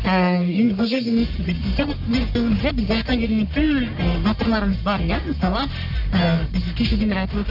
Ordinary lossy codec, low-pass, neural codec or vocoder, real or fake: none; 5.4 kHz; codec, 44.1 kHz, 1.7 kbps, Pupu-Codec; fake